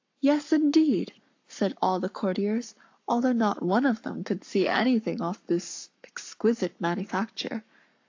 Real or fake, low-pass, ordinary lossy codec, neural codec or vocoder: fake; 7.2 kHz; AAC, 48 kbps; codec, 44.1 kHz, 7.8 kbps, Pupu-Codec